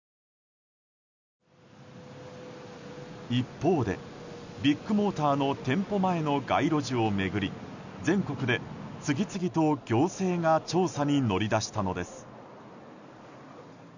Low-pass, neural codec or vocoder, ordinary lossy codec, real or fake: 7.2 kHz; none; none; real